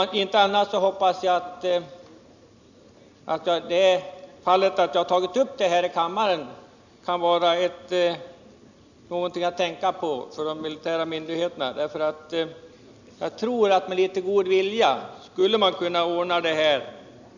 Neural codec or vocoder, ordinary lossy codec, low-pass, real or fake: none; none; 7.2 kHz; real